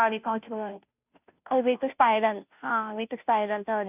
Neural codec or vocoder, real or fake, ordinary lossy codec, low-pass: codec, 16 kHz, 0.5 kbps, FunCodec, trained on Chinese and English, 25 frames a second; fake; none; 3.6 kHz